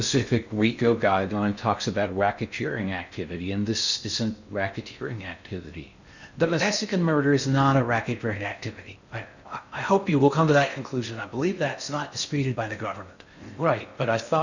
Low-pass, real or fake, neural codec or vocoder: 7.2 kHz; fake; codec, 16 kHz in and 24 kHz out, 0.6 kbps, FocalCodec, streaming, 4096 codes